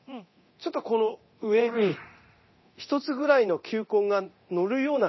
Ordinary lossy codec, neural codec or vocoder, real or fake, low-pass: MP3, 24 kbps; codec, 24 kHz, 0.9 kbps, DualCodec; fake; 7.2 kHz